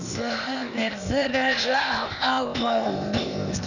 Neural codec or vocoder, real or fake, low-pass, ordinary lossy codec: codec, 16 kHz, 0.8 kbps, ZipCodec; fake; 7.2 kHz; none